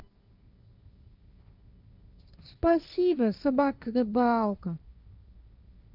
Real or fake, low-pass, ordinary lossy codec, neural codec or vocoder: fake; 5.4 kHz; none; codec, 16 kHz, 1.1 kbps, Voila-Tokenizer